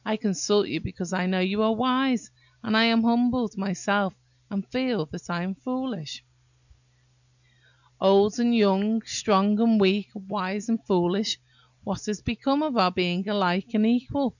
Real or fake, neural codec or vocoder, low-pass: real; none; 7.2 kHz